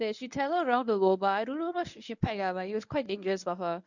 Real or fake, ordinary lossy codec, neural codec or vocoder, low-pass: fake; none; codec, 24 kHz, 0.9 kbps, WavTokenizer, medium speech release version 1; 7.2 kHz